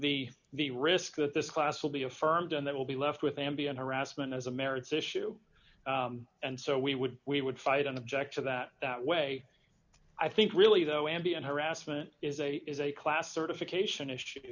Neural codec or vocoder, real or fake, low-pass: none; real; 7.2 kHz